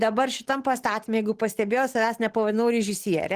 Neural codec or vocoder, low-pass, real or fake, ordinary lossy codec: none; 14.4 kHz; real; Opus, 16 kbps